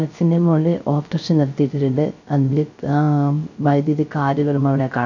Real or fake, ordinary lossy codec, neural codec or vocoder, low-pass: fake; none; codec, 16 kHz, 0.3 kbps, FocalCodec; 7.2 kHz